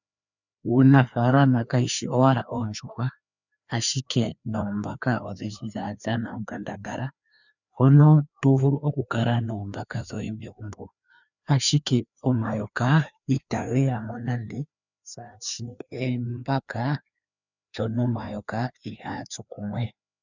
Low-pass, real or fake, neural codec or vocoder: 7.2 kHz; fake; codec, 16 kHz, 2 kbps, FreqCodec, larger model